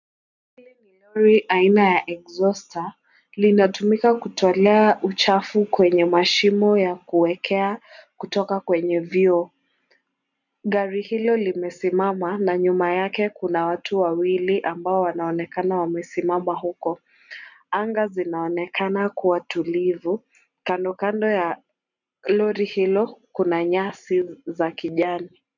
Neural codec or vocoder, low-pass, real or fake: none; 7.2 kHz; real